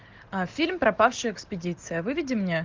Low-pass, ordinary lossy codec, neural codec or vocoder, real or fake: 7.2 kHz; Opus, 32 kbps; none; real